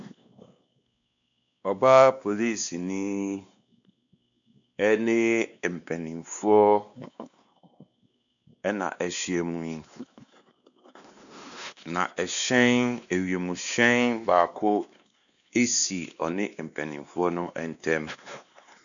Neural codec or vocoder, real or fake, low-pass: codec, 16 kHz, 2 kbps, X-Codec, WavLM features, trained on Multilingual LibriSpeech; fake; 7.2 kHz